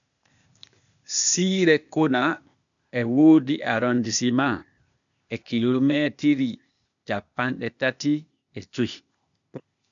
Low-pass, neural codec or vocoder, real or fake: 7.2 kHz; codec, 16 kHz, 0.8 kbps, ZipCodec; fake